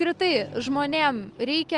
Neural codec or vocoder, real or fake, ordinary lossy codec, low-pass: none; real; Opus, 24 kbps; 10.8 kHz